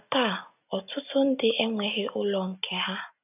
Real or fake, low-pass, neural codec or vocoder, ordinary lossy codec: real; 3.6 kHz; none; none